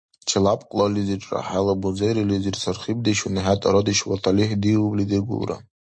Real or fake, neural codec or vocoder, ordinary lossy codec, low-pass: real; none; MP3, 48 kbps; 9.9 kHz